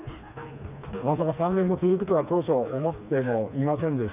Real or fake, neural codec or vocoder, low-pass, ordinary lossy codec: fake; codec, 16 kHz, 2 kbps, FreqCodec, smaller model; 3.6 kHz; none